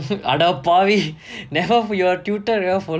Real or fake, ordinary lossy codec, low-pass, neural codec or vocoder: real; none; none; none